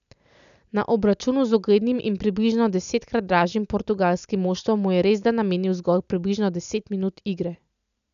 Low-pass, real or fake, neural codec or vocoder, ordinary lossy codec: 7.2 kHz; real; none; none